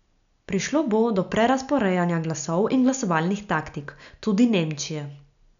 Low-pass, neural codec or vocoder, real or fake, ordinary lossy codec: 7.2 kHz; none; real; none